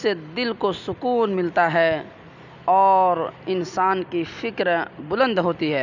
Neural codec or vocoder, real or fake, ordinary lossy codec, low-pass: none; real; none; 7.2 kHz